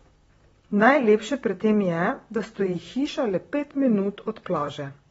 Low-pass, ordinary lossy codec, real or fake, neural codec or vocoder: 19.8 kHz; AAC, 24 kbps; fake; vocoder, 44.1 kHz, 128 mel bands, Pupu-Vocoder